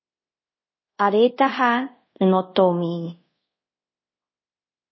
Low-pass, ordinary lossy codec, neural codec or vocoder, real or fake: 7.2 kHz; MP3, 24 kbps; codec, 24 kHz, 0.5 kbps, DualCodec; fake